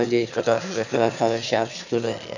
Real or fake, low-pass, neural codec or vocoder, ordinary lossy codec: fake; 7.2 kHz; autoencoder, 22.05 kHz, a latent of 192 numbers a frame, VITS, trained on one speaker; none